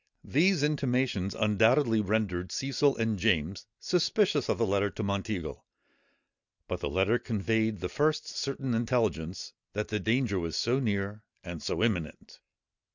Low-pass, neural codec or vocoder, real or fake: 7.2 kHz; none; real